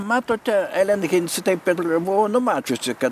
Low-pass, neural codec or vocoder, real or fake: 14.4 kHz; none; real